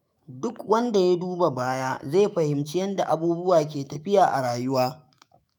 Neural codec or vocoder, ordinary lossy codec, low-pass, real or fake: autoencoder, 48 kHz, 128 numbers a frame, DAC-VAE, trained on Japanese speech; none; none; fake